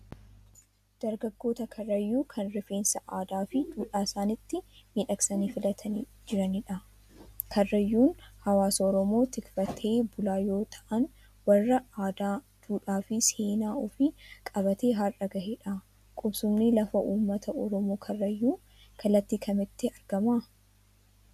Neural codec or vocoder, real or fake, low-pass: none; real; 14.4 kHz